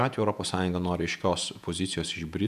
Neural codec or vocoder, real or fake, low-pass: vocoder, 48 kHz, 128 mel bands, Vocos; fake; 14.4 kHz